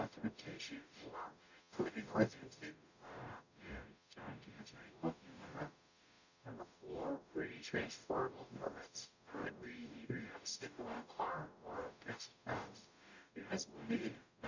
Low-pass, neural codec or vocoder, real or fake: 7.2 kHz; codec, 44.1 kHz, 0.9 kbps, DAC; fake